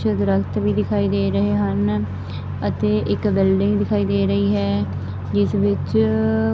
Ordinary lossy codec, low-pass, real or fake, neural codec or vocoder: none; none; real; none